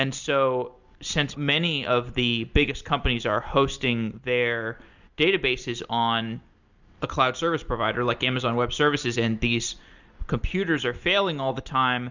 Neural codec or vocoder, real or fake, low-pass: none; real; 7.2 kHz